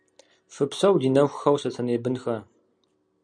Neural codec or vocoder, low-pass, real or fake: none; 9.9 kHz; real